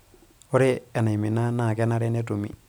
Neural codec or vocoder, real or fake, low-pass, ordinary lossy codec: none; real; none; none